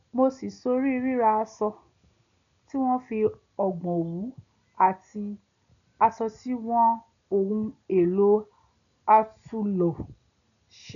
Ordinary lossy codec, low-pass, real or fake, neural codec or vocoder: none; 7.2 kHz; real; none